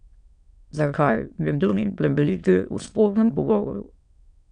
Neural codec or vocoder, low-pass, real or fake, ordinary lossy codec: autoencoder, 22.05 kHz, a latent of 192 numbers a frame, VITS, trained on many speakers; 9.9 kHz; fake; none